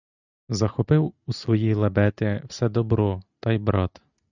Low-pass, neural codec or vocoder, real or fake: 7.2 kHz; none; real